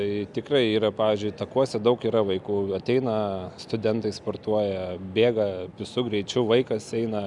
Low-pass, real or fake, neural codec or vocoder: 10.8 kHz; real; none